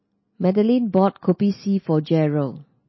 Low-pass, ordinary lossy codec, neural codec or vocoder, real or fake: 7.2 kHz; MP3, 24 kbps; none; real